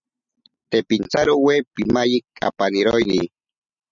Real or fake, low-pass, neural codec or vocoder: real; 7.2 kHz; none